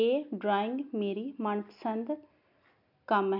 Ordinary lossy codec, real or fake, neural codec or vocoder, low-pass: none; real; none; 5.4 kHz